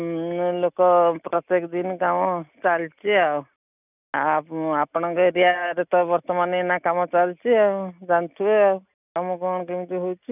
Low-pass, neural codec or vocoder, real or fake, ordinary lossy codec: 3.6 kHz; none; real; none